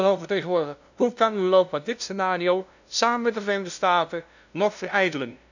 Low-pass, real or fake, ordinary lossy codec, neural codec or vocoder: 7.2 kHz; fake; none; codec, 16 kHz, 0.5 kbps, FunCodec, trained on LibriTTS, 25 frames a second